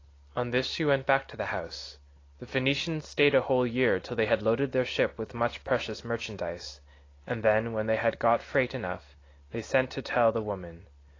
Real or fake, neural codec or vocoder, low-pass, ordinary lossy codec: real; none; 7.2 kHz; AAC, 32 kbps